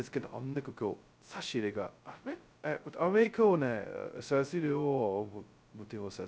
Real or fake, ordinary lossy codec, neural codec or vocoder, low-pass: fake; none; codec, 16 kHz, 0.2 kbps, FocalCodec; none